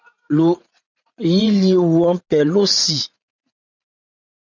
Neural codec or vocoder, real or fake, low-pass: vocoder, 44.1 kHz, 80 mel bands, Vocos; fake; 7.2 kHz